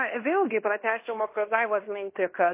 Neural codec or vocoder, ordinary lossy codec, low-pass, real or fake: codec, 16 kHz in and 24 kHz out, 0.9 kbps, LongCat-Audio-Codec, fine tuned four codebook decoder; MP3, 24 kbps; 3.6 kHz; fake